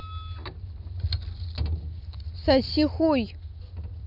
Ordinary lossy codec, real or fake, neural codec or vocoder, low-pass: none; real; none; 5.4 kHz